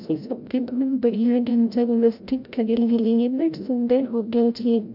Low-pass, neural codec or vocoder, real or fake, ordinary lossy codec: 5.4 kHz; codec, 16 kHz, 0.5 kbps, FreqCodec, larger model; fake; none